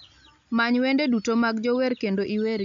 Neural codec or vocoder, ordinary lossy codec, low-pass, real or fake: none; MP3, 64 kbps; 7.2 kHz; real